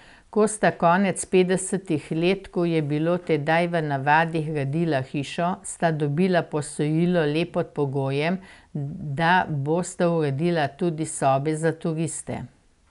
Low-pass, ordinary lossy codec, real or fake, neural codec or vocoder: 10.8 kHz; none; real; none